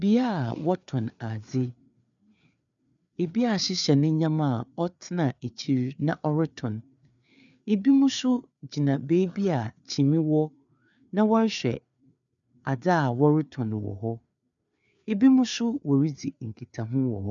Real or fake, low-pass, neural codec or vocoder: fake; 7.2 kHz; codec, 16 kHz, 4 kbps, FreqCodec, larger model